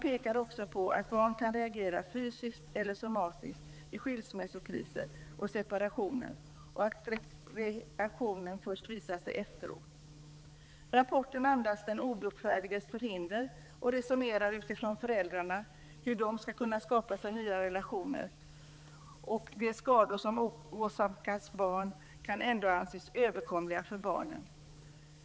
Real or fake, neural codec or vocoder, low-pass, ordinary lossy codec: fake; codec, 16 kHz, 4 kbps, X-Codec, HuBERT features, trained on balanced general audio; none; none